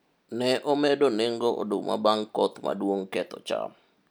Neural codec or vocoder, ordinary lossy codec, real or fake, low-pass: none; none; real; none